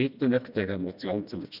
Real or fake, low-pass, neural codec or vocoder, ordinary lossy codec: fake; 5.4 kHz; codec, 16 kHz, 1 kbps, FreqCodec, smaller model; none